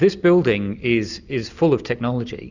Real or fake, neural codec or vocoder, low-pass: real; none; 7.2 kHz